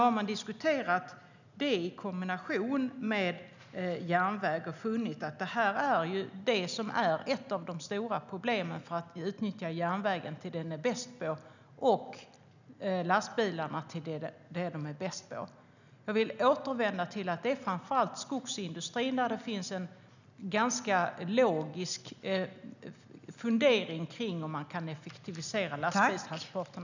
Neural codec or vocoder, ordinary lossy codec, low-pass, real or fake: none; none; 7.2 kHz; real